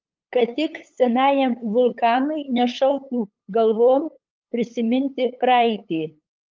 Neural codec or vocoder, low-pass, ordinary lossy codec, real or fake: codec, 16 kHz, 8 kbps, FunCodec, trained on LibriTTS, 25 frames a second; 7.2 kHz; Opus, 24 kbps; fake